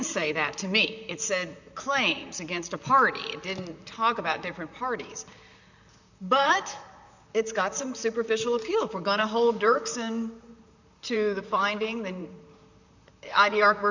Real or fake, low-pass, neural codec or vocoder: fake; 7.2 kHz; vocoder, 44.1 kHz, 128 mel bands, Pupu-Vocoder